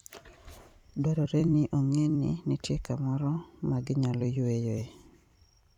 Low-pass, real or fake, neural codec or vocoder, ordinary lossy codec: 19.8 kHz; fake; vocoder, 44.1 kHz, 128 mel bands every 256 samples, BigVGAN v2; none